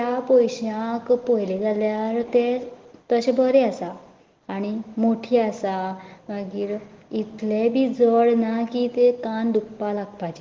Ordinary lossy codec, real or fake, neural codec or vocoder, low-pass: Opus, 16 kbps; real; none; 7.2 kHz